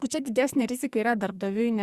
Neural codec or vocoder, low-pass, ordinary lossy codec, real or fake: codec, 44.1 kHz, 2.6 kbps, SNAC; 14.4 kHz; Opus, 64 kbps; fake